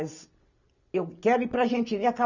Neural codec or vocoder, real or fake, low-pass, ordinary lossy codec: none; real; 7.2 kHz; none